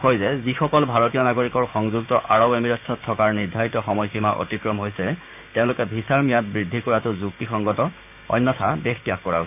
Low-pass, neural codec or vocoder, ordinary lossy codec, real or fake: 3.6 kHz; codec, 16 kHz, 6 kbps, DAC; none; fake